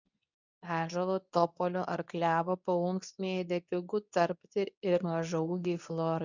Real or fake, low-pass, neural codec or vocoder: fake; 7.2 kHz; codec, 24 kHz, 0.9 kbps, WavTokenizer, medium speech release version 2